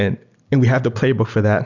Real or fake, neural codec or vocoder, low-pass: real; none; 7.2 kHz